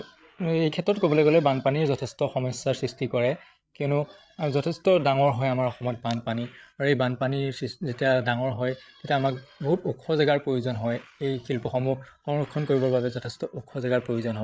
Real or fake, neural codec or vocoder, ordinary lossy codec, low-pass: fake; codec, 16 kHz, 8 kbps, FreqCodec, larger model; none; none